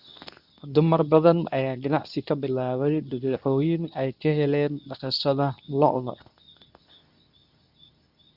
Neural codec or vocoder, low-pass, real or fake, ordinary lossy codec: codec, 24 kHz, 0.9 kbps, WavTokenizer, medium speech release version 2; 5.4 kHz; fake; none